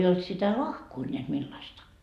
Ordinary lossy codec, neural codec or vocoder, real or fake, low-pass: Opus, 64 kbps; none; real; 14.4 kHz